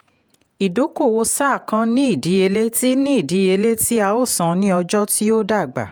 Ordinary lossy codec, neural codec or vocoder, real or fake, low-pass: none; vocoder, 48 kHz, 128 mel bands, Vocos; fake; none